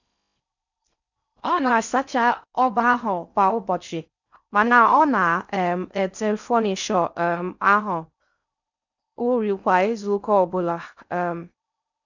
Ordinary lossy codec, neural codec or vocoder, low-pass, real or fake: none; codec, 16 kHz in and 24 kHz out, 0.6 kbps, FocalCodec, streaming, 4096 codes; 7.2 kHz; fake